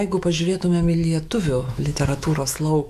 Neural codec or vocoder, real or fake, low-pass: autoencoder, 48 kHz, 128 numbers a frame, DAC-VAE, trained on Japanese speech; fake; 14.4 kHz